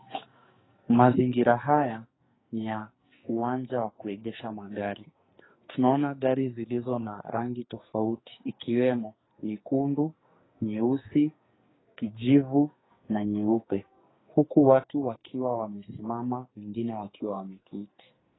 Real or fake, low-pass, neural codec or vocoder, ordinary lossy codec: fake; 7.2 kHz; codec, 44.1 kHz, 2.6 kbps, SNAC; AAC, 16 kbps